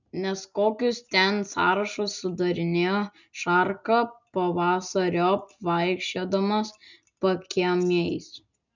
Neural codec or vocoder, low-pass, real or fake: none; 7.2 kHz; real